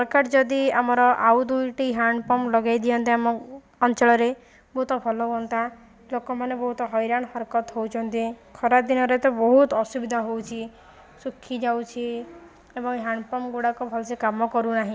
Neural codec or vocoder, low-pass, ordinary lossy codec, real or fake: none; none; none; real